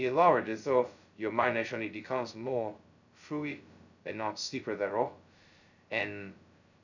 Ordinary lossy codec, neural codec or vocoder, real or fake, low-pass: none; codec, 16 kHz, 0.2 kbps, FocalCodec; fake; 7.2 kHz